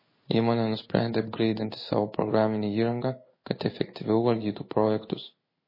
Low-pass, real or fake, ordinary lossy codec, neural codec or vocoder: 5.4 kHz; fake; MP3, 24 kbps; codec, 16 kHz in and 24 kHz out, 1 kbps, XY-Tokenizer